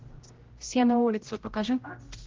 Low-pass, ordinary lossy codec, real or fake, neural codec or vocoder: 7.2 kHz; Opus, 16 kbps; fake; codec, 16 kHz, 0.5 kbps, X-Codec, HuBERT features, trained on general audio